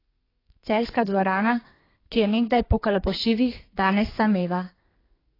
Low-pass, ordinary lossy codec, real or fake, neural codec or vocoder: 5.4 kHz; AAC, 24 kbps; fake; codec, 32 kHz, 1.9 kbps, SNAC